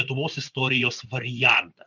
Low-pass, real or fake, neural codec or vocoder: 7.2 kHz; fake; vocoder, 24 kHz, 100 mel bands, Vocos